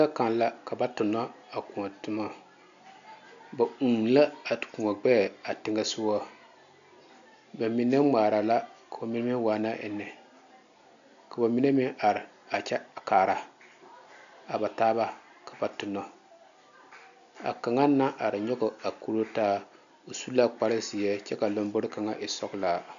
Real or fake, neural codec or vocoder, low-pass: real; none; 7.2 kHz